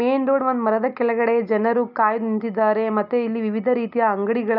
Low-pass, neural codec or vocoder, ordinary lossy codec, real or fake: 5.4 kHz; none; none; real